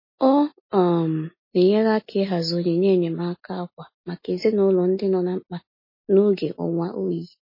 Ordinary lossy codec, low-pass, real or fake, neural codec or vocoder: MP3, 24 kbps; 5.4 kHz; real; none